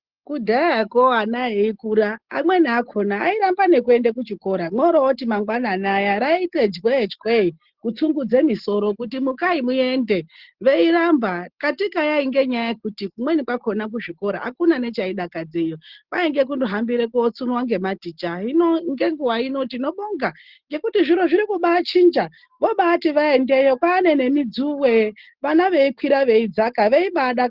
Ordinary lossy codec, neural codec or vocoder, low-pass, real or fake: Opus, 16 kbps; none; 5.4 kHz; real